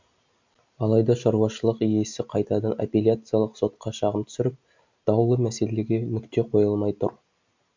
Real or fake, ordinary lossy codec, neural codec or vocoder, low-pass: real; MP3, 64 kbps; none; 7.2 kHz